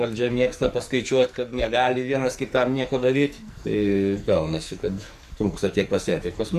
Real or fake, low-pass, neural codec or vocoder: fake; 14.4 kHz; codec, 44.1 kHz, 2.6 kbps, SNAC